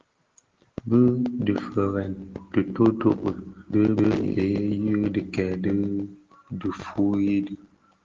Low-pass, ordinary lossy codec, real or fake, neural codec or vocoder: 7.2 kHz; Opus, 16 kbps; real; none